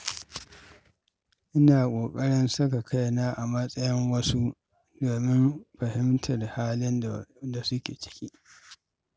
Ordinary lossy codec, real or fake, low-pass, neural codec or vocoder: none; real; none; none